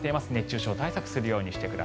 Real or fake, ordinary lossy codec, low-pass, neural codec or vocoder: real; none; none; none